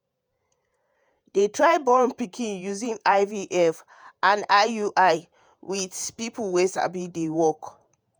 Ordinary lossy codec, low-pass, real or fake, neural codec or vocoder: none; none; fake; vocoder, 48 kHz, 128 mel bands, Vocos